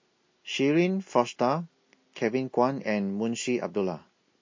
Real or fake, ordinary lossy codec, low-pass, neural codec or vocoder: real; MP3, 32 kbps; 7.2 kHz; none